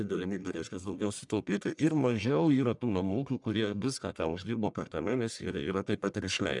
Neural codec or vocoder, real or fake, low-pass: codec, 44.1 kHz, 1.7 kbps, Pupu-Codec; fake; 10.8 kHz